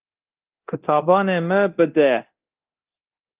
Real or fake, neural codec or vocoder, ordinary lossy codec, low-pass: fake; codec, 24 kHz, 0.9 kbps, DualCodec; Opus, 32 kbps; 3.6 kHz